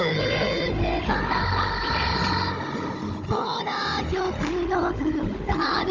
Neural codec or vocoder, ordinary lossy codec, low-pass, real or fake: codec, 16 kHz, 16 kbps, FunCodec, trained on Chinese and English, 50 frames a second; Opus, 32 kbps; 7.2 kHz; fake